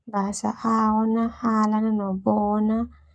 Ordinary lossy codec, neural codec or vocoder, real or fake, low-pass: none; codec, 44.1 kHz, 7.8 kbps, Pupu-Codec; fake; 9.9 kHz